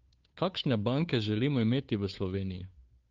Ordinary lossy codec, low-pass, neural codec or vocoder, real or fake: Opus, 32 kbps; 7.2 kHz; codec, 16 kHz, 2 kbps, FunCodec, trained on Chinese and English, 25 frames a second; fake